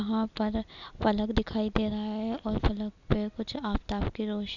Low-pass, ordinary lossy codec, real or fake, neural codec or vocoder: 7.2 kHz; none; real; none